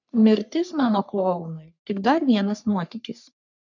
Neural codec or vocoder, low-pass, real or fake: codec, 44.1 kHz, 3.4 kbps, Pupu-Codec; 7.2 kHz; fake